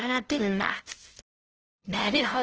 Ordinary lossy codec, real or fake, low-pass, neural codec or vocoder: Opus, 16 kbps; fake; 7.2 kHz; codec, 16 kHz, 0.5 kbps, FunCodec, trained on LibriTTS, 25 frames a second